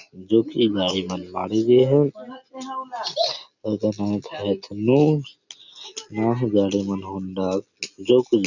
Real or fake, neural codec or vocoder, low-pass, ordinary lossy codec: real; none; 7.2 kHz; none